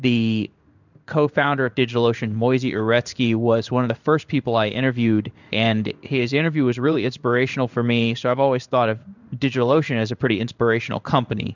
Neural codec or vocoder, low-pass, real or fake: codec, 16 kHz in and 24 kHz out, 1 kbps, XY-Tokenizer; 7.2 kHz; fake